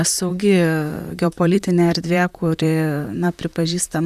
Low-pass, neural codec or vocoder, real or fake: 14.4 kHz; vocoder, 44.1 kHz, 128 mel bands, Pupu-Vocoder; fake